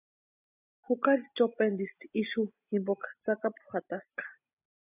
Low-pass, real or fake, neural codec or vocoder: 3.6 kHz; real; none